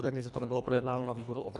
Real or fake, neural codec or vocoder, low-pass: fake; codec, 24 kHz, 1.5 kbps, HILCodec; 10.8 kHz